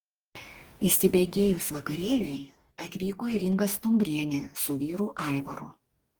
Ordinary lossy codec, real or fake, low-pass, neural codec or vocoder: Opus, 24 kbps; fake; 19.8 kHz; codec, 44.1 kHz, 2.6 kbps, DAC